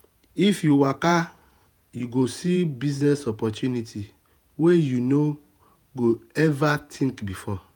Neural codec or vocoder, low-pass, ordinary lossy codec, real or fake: vocoder, 48 kHz, 128 mel bands, Vocos; none; none; fake